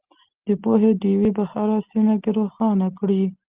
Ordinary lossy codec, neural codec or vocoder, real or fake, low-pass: Opus, 32 kbps; none; real; 3.6 kHz